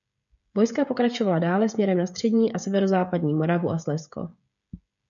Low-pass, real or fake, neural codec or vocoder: 7.2 kHz; fake; codec, 16 kHz, 16 kbps, FreqCodec, smaller model